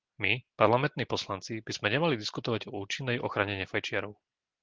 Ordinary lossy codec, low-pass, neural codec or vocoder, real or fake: Opus, 16 kbps; 7.2 kHz; none; real